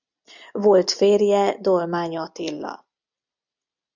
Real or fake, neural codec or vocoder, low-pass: real; none; 7.2 kHz